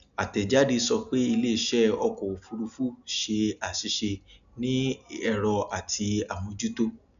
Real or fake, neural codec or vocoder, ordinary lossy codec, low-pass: real; none; none; 7.2 kHz